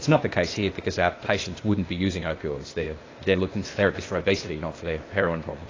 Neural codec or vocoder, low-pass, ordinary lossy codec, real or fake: codec, 16 kHz, 0.8 kbps, ZipCodec; 7.2 kHz; AAC, 32 kbps; fake